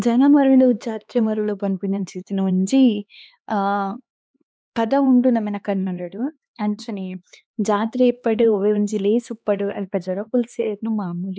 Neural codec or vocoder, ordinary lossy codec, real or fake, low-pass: codec, 16 kHz, 2 kbps, X-Codec, HuBERT features, trained on LibriSpeech; none; fake; none